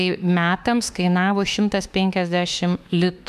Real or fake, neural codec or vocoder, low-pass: fake; autoencoder, 48 kHz, 32 numbers a frame, DAC-VAE, trained on Japanese speech; 14.4 kHz